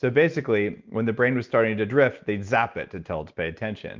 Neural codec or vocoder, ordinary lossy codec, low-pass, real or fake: none; Opus, 24 kbps; 7.2 kHz; real